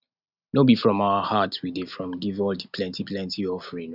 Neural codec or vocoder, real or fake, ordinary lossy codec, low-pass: none; real; none; 5.4 kHz